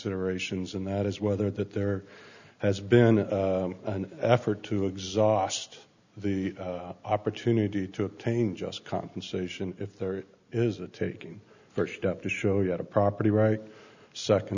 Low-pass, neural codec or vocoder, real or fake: 7.2 kHz; none; real